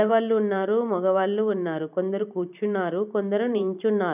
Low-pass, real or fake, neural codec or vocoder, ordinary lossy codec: 3.6 kHz; fake; vocoder, 44.1 kHz, 128 mel bands every 512 samples, BigVGAN v2; none